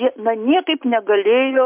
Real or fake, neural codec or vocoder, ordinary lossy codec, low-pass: real; none; MP3, 32 kbps; 3.6 kHz